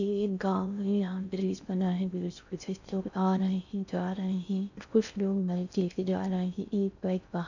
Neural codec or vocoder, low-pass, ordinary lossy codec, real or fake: codec, 16 kHz in and 24 kHz out, 0.6 kbps, FocalCodec, streaming, 4096 codes; 7.2 kHz; none; fake